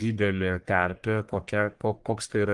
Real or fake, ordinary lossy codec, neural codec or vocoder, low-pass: fake; Opus, 24 kbps; codec, 44.1 kHz, 1.7 kbps, Pupu-Codec; 10.8 kHz